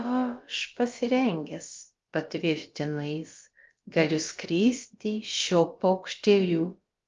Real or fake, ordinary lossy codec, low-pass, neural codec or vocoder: fake; Opus, 32 kbps; 7.2 kHz; codec, 16 kHz, about 1 kbps, DyCAST, with the encoder's durations